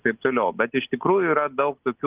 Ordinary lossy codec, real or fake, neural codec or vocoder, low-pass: Opus, 32 kbps; real; none; 3.6 kHz